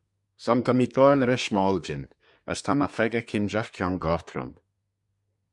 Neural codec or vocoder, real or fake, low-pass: codec, 24 kHz, 1 kbps, SNAC; fake; 10.8 kHz